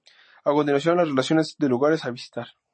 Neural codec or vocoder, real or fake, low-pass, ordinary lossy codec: none; real; 9.9 kHz; MP3, 32 kbps